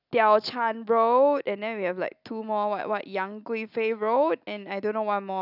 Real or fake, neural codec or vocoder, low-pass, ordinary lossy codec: real; none; 5.4 kHz; none